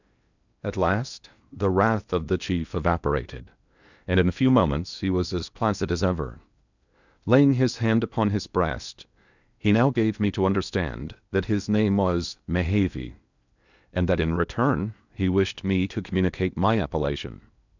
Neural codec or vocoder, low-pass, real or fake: codec, 16 kHz in and 24 kHz out, 0.8 kbps, FocalCodec, streaming, 65536 codes; 7.2 kHz; fake